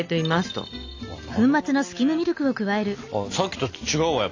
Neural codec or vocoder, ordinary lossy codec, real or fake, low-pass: none; AAC, 48 kbps; real; 7.2 kHz